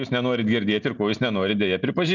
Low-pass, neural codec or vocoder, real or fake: 7.2 kHz; none; real